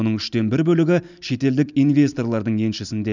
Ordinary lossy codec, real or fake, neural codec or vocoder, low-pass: none; real; none; 7.2 kHz